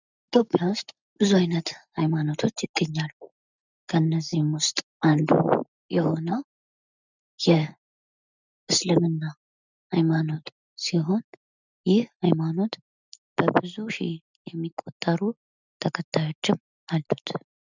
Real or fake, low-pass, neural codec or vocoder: real; 7.2 kHz; none